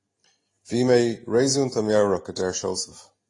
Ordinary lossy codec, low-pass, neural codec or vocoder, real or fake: AAC, 32 kbps; 10.8 kHz; none; real